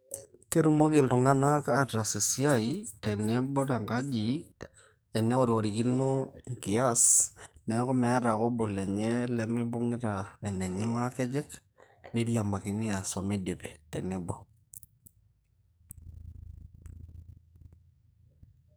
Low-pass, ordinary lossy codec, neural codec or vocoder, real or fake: none; none; codec, 44.1 kHz, 2.6 kbps, SNAC; fake